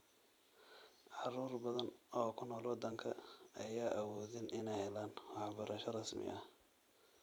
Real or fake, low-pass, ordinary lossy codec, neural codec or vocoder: fake; none; none; vocoder, 44.1 kHz, 128 mel bands every 512 samples, BigVGAN v2